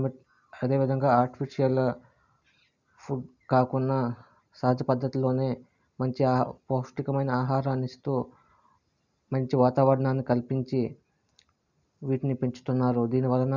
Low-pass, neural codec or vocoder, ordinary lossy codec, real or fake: 7.2 kHz; none; none; real